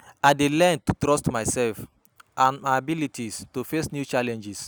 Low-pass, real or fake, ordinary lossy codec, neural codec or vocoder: none; real; none; none